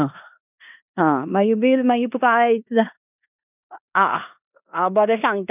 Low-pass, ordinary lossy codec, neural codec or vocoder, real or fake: 3.6 kHz; none; codec, 16 kHz in and 24 kHz out, 0.9 kbps, LongCat-Audio-Codec, four codebook decoder; fake